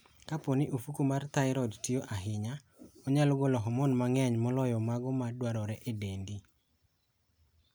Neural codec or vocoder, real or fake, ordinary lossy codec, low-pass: none; real; none; none